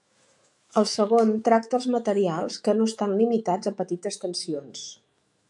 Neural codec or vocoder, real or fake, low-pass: autoencoder, 48 kHz, 128 numbers a frame, DAC-VAE, trained on Japanese speech; fake; 10.8 kHz